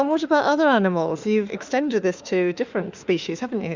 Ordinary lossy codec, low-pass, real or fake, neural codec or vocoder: Opus, 64 kbps; 7.2 kHz; fake; autoencoder, 48 kHz, 32 numbers a frame, DAC-VAE, trained on Japanese speech